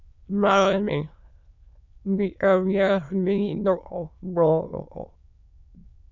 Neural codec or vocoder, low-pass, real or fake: autoencoder, 22.05 kHz, a latent of 192 numbers a frame, VITS, trained on many speakers; 7.2 kHz; fake